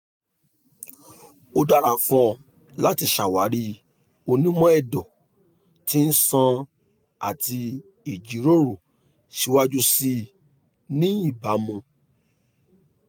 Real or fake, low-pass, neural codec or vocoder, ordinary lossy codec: real; none; none; none